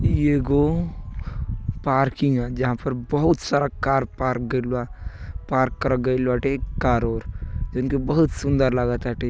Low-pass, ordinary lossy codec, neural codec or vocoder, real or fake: none; none; none; real